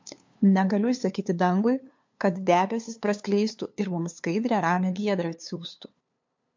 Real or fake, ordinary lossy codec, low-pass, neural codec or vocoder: fake; MP3, 48 kbps; 7.2 kHz; codec, 16 kHz, 2 kbps, FunCodec, trained on LibriTTS, 25 frames a second